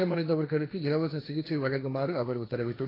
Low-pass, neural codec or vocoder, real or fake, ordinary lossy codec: 5.4 kHz; codec, 16 kHz, 1.1 kbps, Voila-Tokenizer; fake; AAC, 24 kbps